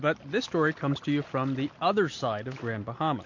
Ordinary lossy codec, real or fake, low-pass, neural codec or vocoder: MP3, 48 kbps; real; 7.2 kHz; none